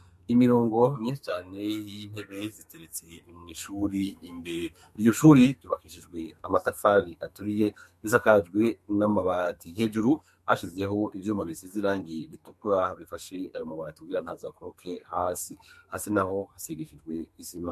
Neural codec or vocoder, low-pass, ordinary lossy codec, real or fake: codec, 32 kHz, 1.9 kbps, SNAC; 14.4 kHz; MP3, 64 kbps; fake